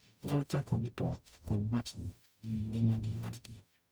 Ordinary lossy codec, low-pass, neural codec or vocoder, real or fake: none; none; codec, 44.1 kHz, 0.9 kbps, DAC; fake